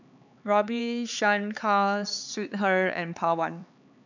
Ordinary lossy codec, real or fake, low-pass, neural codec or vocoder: none; fake; 7.2 kHz; codec, 16 kHz, 4 kbps, X-Codec, HuBERT features, trained on LibriSpeech